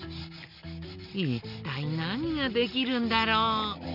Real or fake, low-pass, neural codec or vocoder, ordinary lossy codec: real; 5.4 kHz; none; none